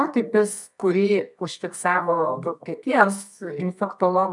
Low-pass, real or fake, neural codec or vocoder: 10.8 kHz; fake; codec, 24 kHz, 0.9 kbps, WavTokenizer, medium music audio release